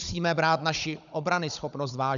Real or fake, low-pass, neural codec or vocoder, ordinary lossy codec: fake; 7.2 kHz; codec, 16 kHz, 16 kbps, FunCodec, trained on Chinese and English, 50 frames a second; MP3, 96 kbps